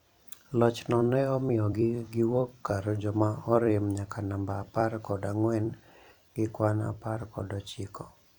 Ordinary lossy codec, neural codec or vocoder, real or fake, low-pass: none; vocoder, 44.1 kHz, 128 mel bands every 256 samples, BigVGAN v2; fake; 19.8 kHz